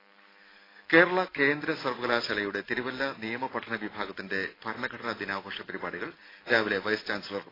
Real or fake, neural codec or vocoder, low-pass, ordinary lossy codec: real; none; 5.4 kHz; AAC, 24 kbps